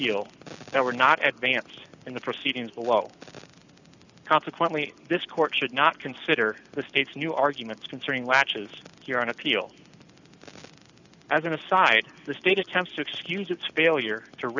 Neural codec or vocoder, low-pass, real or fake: none; 7.2 kHz; real